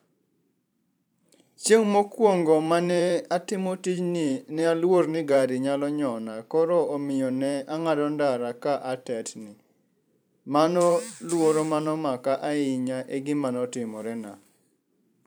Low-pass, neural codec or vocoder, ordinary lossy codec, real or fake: none; vocoder, 44.1 kHz, 128 mel bands every 512 samples, BigVGAN v2; none; fake